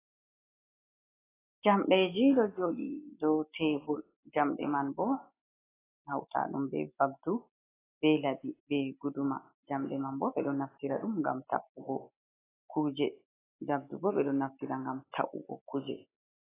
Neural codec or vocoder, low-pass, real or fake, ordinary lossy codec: none; 3.6 kHz; real; AAC, 16 kbps